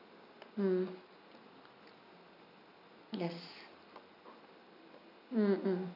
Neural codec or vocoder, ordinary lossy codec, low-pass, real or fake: none; none; 5.4 kHz; real